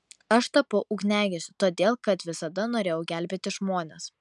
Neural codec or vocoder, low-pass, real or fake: none; 10.8 kHz; real